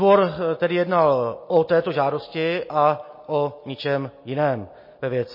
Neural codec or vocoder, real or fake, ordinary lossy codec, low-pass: none; real; MP3, 24 kbps; 5.4 kHz